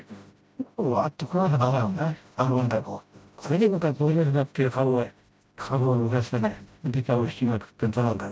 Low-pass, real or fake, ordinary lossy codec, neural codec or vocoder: none; fake; none; codec, 16 kHz, 0.5 kbps, FreqCodec, smaller model